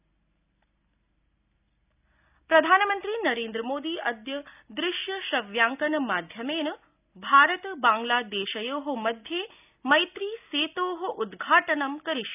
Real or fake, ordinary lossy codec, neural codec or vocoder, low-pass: real; none; none; 3.6 kHz